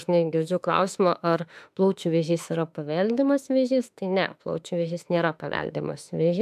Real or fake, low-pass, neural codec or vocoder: fake; 14.4 kHz; autoencoder, 48 kHz, 32 numbers a frame, DAC-VAE, trained on Japanese speech